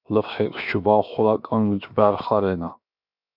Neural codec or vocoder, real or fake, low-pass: codec, 16 kHz, 0.7 kbps, FocalCodec; fake; 5.4 kHz